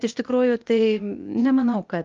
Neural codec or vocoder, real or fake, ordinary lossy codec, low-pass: codec, 16 kHz, 0.8 kbps, ZipCodec; fake; Opus, 32 kbps; 7.2 kHz